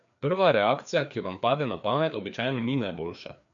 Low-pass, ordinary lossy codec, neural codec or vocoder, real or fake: 7.2 kHz; MP3, 64 kbps; codec, 16 kHz, 2 kbps, FreqCodec, larger model; fake